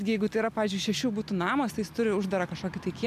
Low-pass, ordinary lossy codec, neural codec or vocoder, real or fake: 14.4 kHz; MP3, 96 kbps; none; real